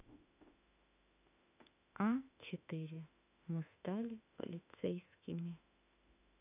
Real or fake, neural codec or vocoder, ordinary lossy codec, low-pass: fake; autoencoder, 48 kHz, 32 numbers a frame, DAC-VAE, trained on Japanese speech; none; 3.6 kHz